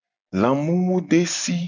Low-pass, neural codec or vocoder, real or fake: 7.2 kHz; none; real